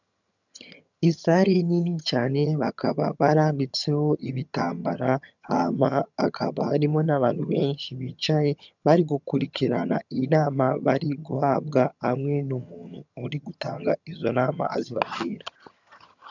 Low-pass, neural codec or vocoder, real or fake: 7.2 kHz; vocoder, 22.05 kHz, 80 mel bands, HiFi-GAN; fake